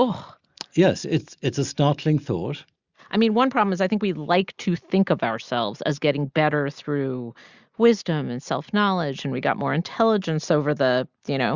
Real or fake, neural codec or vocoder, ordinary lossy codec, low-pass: real; none; Opus, 64 kbps; 7.2 kHz